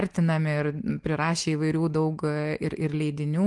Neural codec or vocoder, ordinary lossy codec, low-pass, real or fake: none; Opus, 24 kbps; 10.8 kHz; real